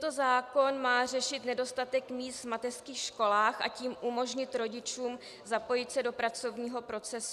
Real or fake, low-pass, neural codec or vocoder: real; 14.4 kHz; none